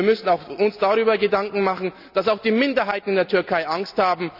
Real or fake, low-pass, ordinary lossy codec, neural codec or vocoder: real; 5.4 kHz; none; none